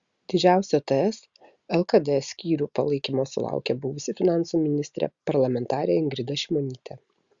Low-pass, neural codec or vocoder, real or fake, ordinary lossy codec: 7.2 kHz; none; real; Opus, 64 kbps